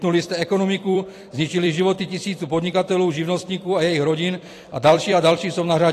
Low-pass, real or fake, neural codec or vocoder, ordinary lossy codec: 14.4 kHz; fake; vocoder, 44.1 kHz, 128 mel bands every 256 samples, BigVGAN v2; AAC, 48 kbps